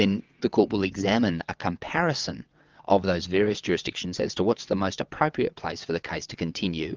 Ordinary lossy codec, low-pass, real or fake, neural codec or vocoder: Opus, 24 kbps; 7.2 kHz; fake; vocoder, 22.05 kHz, 80 mel bands, WaveNeXt